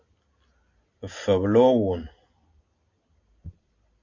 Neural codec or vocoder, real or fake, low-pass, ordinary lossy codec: none; real; 7.2 kHz; AAC, 48 kbps